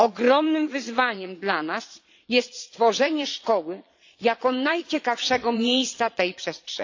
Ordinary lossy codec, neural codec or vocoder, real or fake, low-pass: AAC, 48 kbps; vocoder, 22.05 kHz, 80 mel bands, Vocos; fake; 7.2 kHz